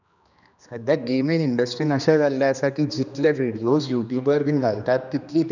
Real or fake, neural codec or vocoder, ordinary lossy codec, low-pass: fake; codec, 16 kHz, 2 kbps, X-Codec, HuBERT features, trained on general audio; none; 7.2 kHz